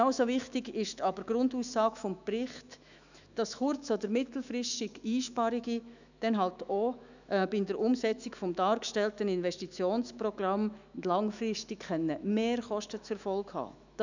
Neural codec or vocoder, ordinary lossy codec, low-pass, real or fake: autoencoder, 48 kHz, 128 numbers a frame, DAC-VAE, trained on Japanese speech; none; 7.2 kHz; fake